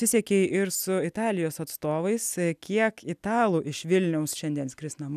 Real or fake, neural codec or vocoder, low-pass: real; none; 14.4 kHz